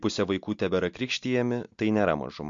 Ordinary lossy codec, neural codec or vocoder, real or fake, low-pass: MP3, 48 kbps; none; real; 7.2 kHz